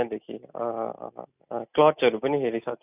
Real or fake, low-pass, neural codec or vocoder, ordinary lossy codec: real; 3.6 kHz; none; none